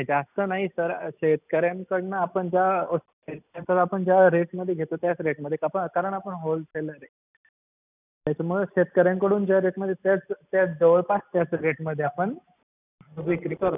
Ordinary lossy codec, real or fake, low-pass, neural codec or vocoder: none; real; 3.6 kHz; none